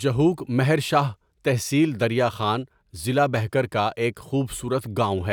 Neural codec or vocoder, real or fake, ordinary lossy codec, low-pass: none; real; none; 19.8 kHz